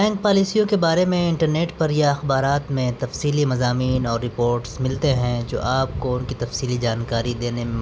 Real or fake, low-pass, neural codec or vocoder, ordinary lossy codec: real; none; none; none